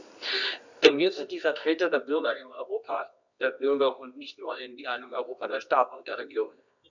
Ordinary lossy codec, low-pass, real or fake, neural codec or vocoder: none; 7.2 kHz; fake; codec, 24 kHz, 0.9 kbps, WavTokenizer, medium music audio release